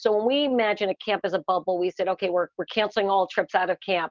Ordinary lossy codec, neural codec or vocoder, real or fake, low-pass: Opus, 32 kbps; none; real; 7.2 kHz